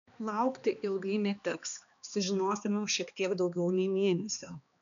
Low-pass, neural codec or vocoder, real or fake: 7.2 kHz; codec, 16 kHz, 2 kbps, X-Codec, HuBERT features, trained on balanced general audio; fake